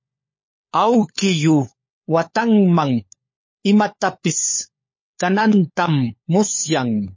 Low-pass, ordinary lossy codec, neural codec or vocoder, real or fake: 7.2 kHz; MP3, 32 kbps; codec, 16 kHz, 4 kbps, FunCodec, trained on LibriTTS, 50 frames a second; fake